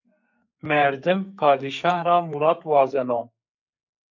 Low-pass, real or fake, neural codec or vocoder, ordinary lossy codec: 7.2 kHz; fake; codec, 44.1 kHz, 2.6 kbps, SNAC; MP3, 64 kbps